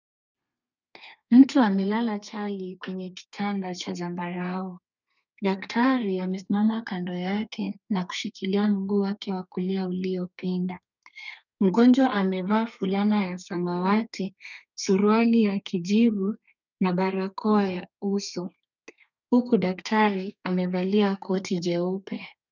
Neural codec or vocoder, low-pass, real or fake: codec, 32 kHz, 1.9 kbps, SNAC; 7.2 kHz; fake